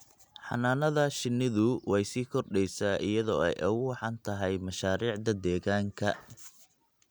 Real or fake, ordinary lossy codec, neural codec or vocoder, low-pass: real; none; none; none